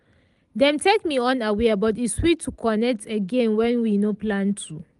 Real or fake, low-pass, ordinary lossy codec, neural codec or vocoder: real; 10.8 kHz; Opus, 32 kbps; none